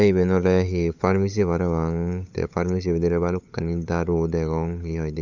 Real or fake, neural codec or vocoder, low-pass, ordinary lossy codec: fake; codec, 16 kHz, 8 kbps, FunCodec, trained on LibriTTS, 25 frames a second; 7.2 kHz; none